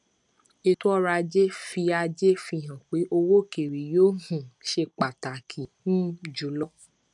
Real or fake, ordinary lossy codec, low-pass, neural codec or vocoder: real; none; 10.8 kHz; none